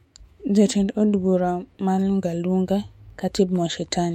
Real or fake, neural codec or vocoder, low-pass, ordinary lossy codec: fake; autoencoder, 48 kHz, 128 numbers a frame, DAC-VAE, trained on Japanese speech; 19.8 kHz; MP3, 64 kbps